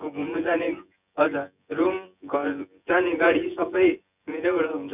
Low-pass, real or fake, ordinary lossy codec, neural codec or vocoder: 3.6 kHz; fake; none; vocoder, 24 kHz, 100 mel bands, Vocos